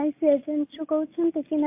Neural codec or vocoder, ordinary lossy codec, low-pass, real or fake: none; MP3, 32 kbps; 3.6 kHz; real